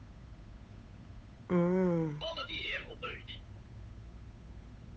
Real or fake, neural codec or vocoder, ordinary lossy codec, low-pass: fake; codec, 16 kHz, 8 kbps, FunCodec, trained on Chinese and English, 25 frames a second; none; none